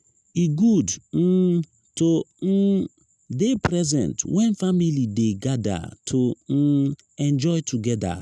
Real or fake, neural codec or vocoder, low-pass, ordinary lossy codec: real; none; none; none